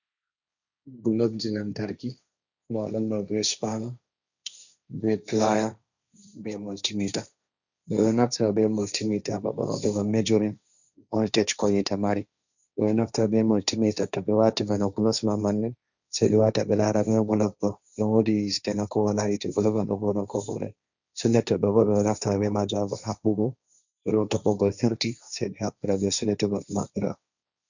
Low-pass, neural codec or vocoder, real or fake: 7.2 kHz; codec, 16 kHz, 1.1 kbps, Voila-Tokenizer; fake